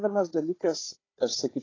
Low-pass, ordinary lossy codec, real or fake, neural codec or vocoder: 7.2 kHz; AAC, 32 kbps; fake; codec, 16 kHz, 4 kbps, FunCodec, trained on Chinese and English, 50 frames a second